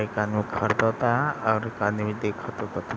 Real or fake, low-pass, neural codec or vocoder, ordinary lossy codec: real; none; none; none